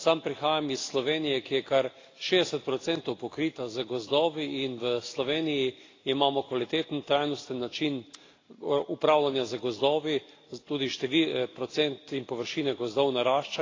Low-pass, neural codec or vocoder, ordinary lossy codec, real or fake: 7.2 kHz; none; AAC, 32 kbps; real